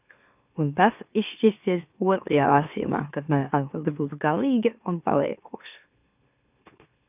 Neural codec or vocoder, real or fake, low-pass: autoencoder, 44.1 kHz, a latent of 192 numbers a frame, MeloTTS; fake; 3.6 kHz